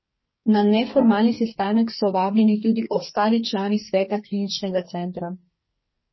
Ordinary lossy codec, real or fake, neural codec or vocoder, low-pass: MP3, 24 kbps; fake; codec, 44.1 kHz, 2.6 kbps, SNAC; 7.2 kHz